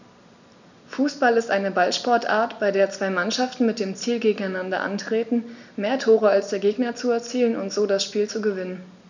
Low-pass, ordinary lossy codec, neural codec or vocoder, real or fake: 7.2 kHz; none; none; real